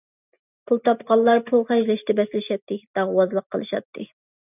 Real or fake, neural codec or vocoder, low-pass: real; none; 3.6 kHz